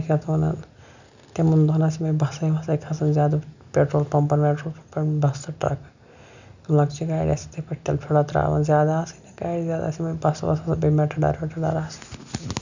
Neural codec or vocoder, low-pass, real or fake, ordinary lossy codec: none; 7.2 kHz; real; none